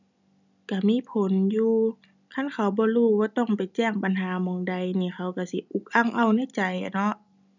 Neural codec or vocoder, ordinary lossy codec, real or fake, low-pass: none; none; real; 7.2 kHz